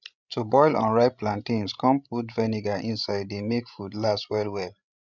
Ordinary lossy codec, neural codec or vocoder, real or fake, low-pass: none; codec, 16 kHz, 16 kbps, FreqCodec, larger model; fake; 7.2 kHz